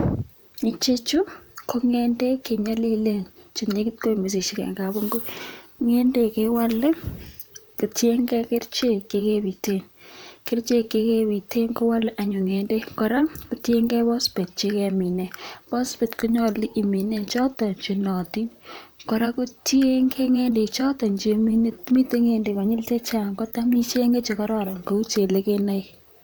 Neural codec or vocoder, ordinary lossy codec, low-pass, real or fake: vocoder, 44.1 kHz, 128 mel bands, Pupu-Vocoder; none; none; fake